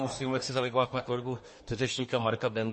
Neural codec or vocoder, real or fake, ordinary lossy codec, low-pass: codec, 24 kHz, 1 kbps, SNAC; fake; MP3, 32 kbps; 10.8 kHz